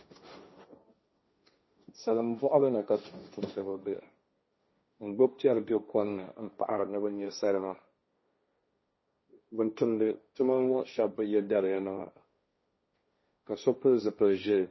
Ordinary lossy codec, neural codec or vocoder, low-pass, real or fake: MP3, 24 kbps; codec, 16 kHz, 1.1 kbps, Voila-Tokenizer; 7.2 kHz; fake